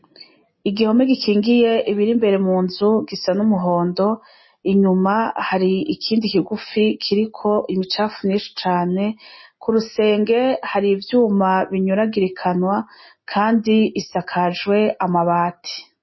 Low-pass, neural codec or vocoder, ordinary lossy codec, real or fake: 7.2 kHz; none; MP3, 24 kbps; real